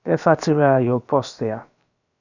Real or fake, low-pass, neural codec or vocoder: fake; 7.2 kHz; codec, 16 kHz, about 1 kbps, DyCAST, with the encoder's durations